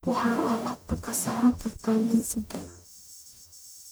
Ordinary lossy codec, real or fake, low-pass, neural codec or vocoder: none; fake; none; codec, 44.1 kHz, 0.9 kbps, DAC